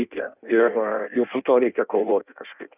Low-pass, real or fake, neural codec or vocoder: 3.6 kHz; fake; codec, 16 kHz in and 24 kHz out, 0.6 kbps, FireRedTTS-2 codec